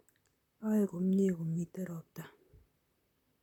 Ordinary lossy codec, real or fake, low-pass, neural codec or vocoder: none; fake; 19.8 kHz; vocoder, 44.1 kHz, 128 mel bands, Pupu-Vocoder